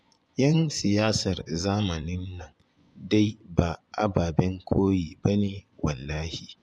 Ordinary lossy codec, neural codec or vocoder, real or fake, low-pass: none; vocoder, 24 kHz, 100 mel bands, Vocos; fake; none